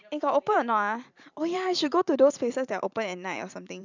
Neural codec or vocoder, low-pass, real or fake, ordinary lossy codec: vocoder, 44.1 kHz, 128 mel bands every 512 samples, BigVGAN v2; 7.2 kHz; fake; none